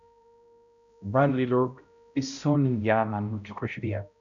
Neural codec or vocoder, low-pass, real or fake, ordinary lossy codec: codec, 16 kHz, 0.5 kbps, X-Codec, HuBERT features, trained on balanced general audio; 7.2 kHz; fake; MP3, 96 kbps